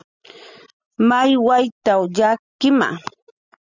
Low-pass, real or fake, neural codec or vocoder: 7.2 kHz; real; none